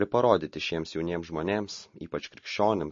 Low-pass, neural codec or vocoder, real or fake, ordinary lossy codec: 7.2 kHz; none; real; MP3, 32 kbps